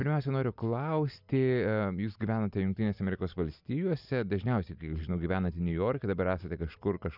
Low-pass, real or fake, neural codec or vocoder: 5.4 kHz; real; none